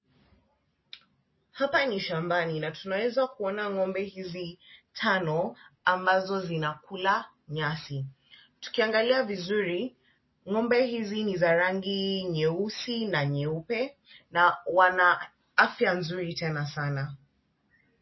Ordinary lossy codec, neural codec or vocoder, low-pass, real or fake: MP3, 24 kbps; none; 7.2 kHz; real